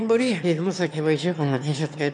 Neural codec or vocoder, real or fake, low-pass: autoencoder, 22.05 kHz, a latent of 192 numbers a frame, VITS, trained on one speaker; fake; 9.9 kHz